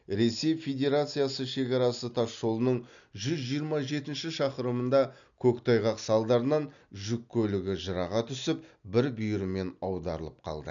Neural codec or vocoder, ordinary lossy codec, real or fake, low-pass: none; none; real; 7.2 kHz